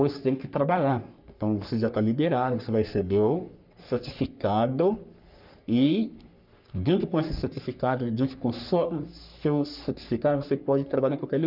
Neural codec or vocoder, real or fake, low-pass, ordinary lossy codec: codec, 44.1 kHz, 3.4 kbps, Pupu-Codec; fake; 5.4 kHz; none